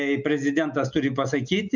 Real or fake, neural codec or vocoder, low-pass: real; none; 7.2 kHz